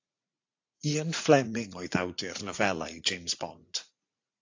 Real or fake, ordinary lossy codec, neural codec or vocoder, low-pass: fake; AAC, 48 kbps; vocoder, 44.1 kHz, 80 mel bands, Vocos; 7.2 kHz